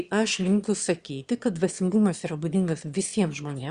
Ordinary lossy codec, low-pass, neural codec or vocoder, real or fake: Opus, 64 kbps; 9.9 kHz; autoencoder, 22.05 kHz, a latent of 192 numbers a frame, VITS, trained on one speaker; fake